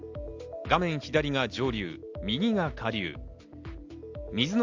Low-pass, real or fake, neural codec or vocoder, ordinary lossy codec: 7.2 kHz; real; none; Opus, 32 kbps